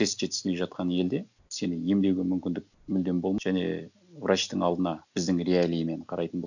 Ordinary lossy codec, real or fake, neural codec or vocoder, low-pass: none; real; none; 7.2 kHz